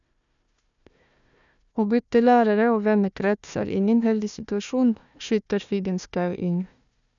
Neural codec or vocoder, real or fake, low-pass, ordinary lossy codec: codec, 16 kHz, 1 kbps, FunCodec, trained on Chinese and English, 50 frames a second; fake; 7.2 kHz; none